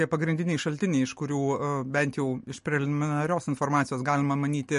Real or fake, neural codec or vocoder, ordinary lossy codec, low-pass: real; none; MP3, 48 kbps; 14.4 kHz